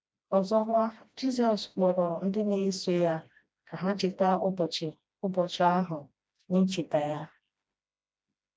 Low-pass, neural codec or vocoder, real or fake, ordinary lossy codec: none; codec, 16 kHz, 1 kbps, FreqCodec, smaller model; fake; none